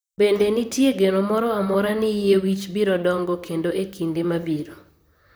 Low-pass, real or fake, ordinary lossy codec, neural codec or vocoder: none; fake; none; vocoder, 44.1 kHz, 128 mel bands, Pupu-Vocoder